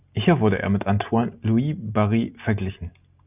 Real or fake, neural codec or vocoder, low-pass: real; none; 3.6 kHz